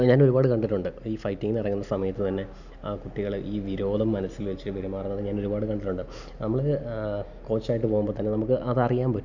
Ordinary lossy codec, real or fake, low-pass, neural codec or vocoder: none; real; 7.2 kHz; none